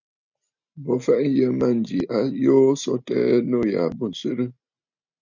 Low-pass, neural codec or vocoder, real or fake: 7.2 kHz; none; real